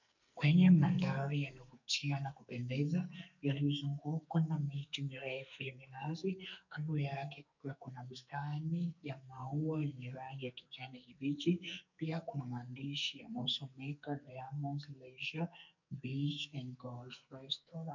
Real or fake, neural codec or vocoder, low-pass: fake; codec, 44.1 kHz, 2.6 kbps, SNAC; 7.2 kHz